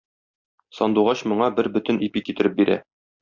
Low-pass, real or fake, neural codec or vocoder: 7.2 kHz; real; none